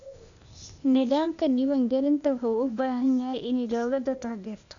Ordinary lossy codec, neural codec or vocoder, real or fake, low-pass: none; codec, 16 kHz, 0.8 kbps, ZipCodec; fake; 7.2 kHz